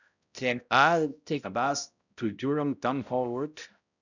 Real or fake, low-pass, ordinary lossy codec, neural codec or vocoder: fake; 7.2 kHz; none; codec, 16 kHz, 0.5 kbps, X-Codec, HuBERT features, trained on balanced general audio